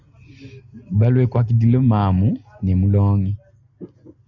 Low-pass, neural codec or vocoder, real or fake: 7.2 kHz; none; real